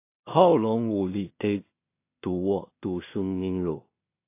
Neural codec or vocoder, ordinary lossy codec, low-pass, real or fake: codec, 16 kHz in and 24 kHz out, 0.4 kbps, LongCat-Audio-Codec, two codebook decoder; AAC, 24 kbps; 3.6 kHz; fake